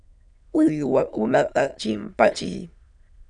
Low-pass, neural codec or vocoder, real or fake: 9.9 kHz; autoencoder, 22.05 kHz, a latent of 192 numbers a frame, VITS, trained on many speakers; fake